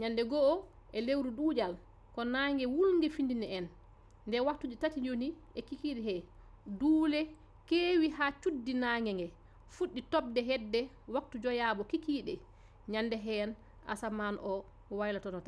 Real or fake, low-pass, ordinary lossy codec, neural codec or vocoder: real; none; none; none